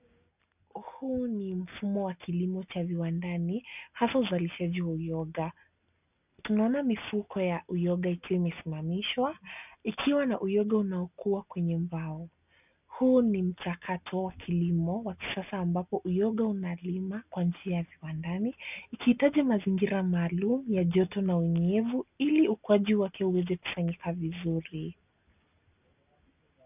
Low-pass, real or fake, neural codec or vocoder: 3.6 kHz; real; none